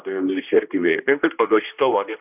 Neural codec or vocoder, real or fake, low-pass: codec, 16 kHz, 1 kbps, X-Codec, HuBERT features, trained on general audio; fake; 3.6 kHz